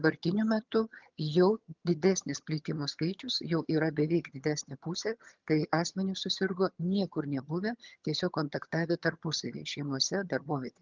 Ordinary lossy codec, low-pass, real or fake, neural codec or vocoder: Opus, 24 kbps; 7.2 kHz; fake; vocoder, 22.05 kHz, 80 mel bands, HiFi-GAN